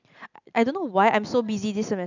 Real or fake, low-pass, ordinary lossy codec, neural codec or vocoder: real; 7.2 kHz; none; none